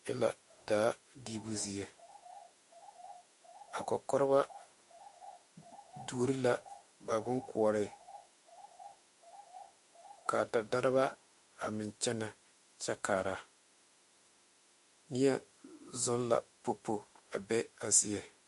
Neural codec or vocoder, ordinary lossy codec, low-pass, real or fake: autoencoder, 48 kHz, 32 numbers a frame, DAC-VAE, trained on Japanese speech; MP3, 48 kbps; 14.4 kHz; fake